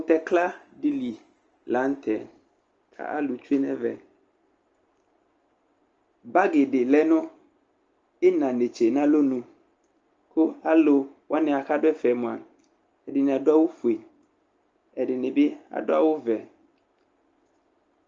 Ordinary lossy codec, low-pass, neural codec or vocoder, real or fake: Opus, 24 kbps; 7.2 kHz; none; real